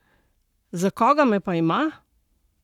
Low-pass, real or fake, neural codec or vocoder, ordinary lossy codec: 19.8 kHz; fake; codec, 44.1 kHz, 7.8 kbps, Pupu-Codec; none